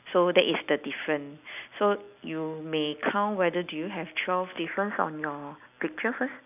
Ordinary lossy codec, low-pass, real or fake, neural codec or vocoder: none; 3.6 kHz; real; none